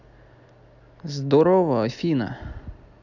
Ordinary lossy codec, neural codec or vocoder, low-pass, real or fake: none; none; 7.2 kHz; real